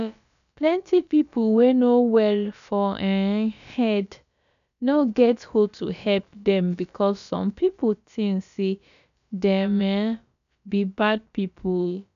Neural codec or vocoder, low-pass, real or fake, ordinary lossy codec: codec, 16 kHz, about 1 kbps, DyCAST, with the encoder's durations; 7.2 kHz; fake; none